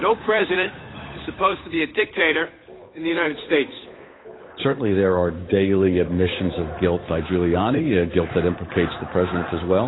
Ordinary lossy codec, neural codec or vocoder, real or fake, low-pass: AAC, 16 kbps; codec, 16 kHz, 8 kbps, FunCodec, trained on Chinese and English, 25 frames a second; fake; 7.2 kHz